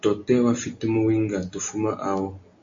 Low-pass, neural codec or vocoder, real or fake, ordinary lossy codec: 7.2 kHz; none; real; MP3, 48 kbps